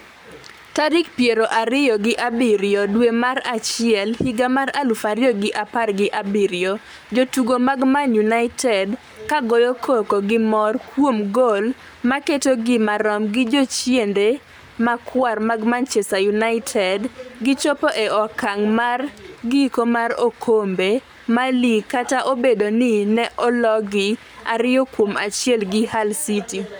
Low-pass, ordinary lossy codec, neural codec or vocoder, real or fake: none; none; codec, 44.1 kHz, 7.8 kbps, Pupu-Codec; fake